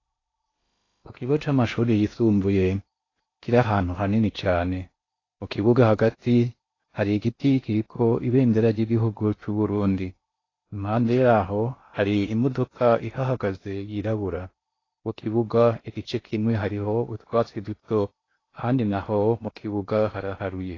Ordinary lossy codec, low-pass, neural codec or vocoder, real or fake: AAC, 32 kbps; 7.2 kHz; codec, 16 kHz in and 24 kHz out, 0.8 kbps, FocalCodec, streaming, 65536 codes; fake